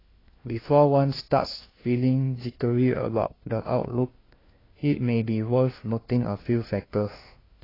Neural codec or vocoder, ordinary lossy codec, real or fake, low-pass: codec, 16 kHz, 1 kbps, FunCodec, trained on LibriTTS, 50 frames a second; AAC, 24 kbps; fake; 5.4 kHz